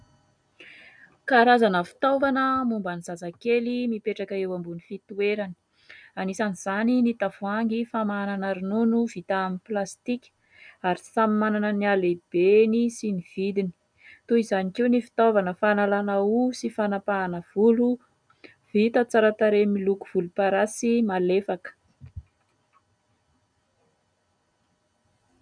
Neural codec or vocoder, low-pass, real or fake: none; 9.9 kHz; real